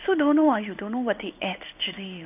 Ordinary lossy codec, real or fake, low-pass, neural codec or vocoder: none; fake; 3.6 kHz; codec, 16 kHz in and 24 kHz out, 1 kbps, XY-Tokenizer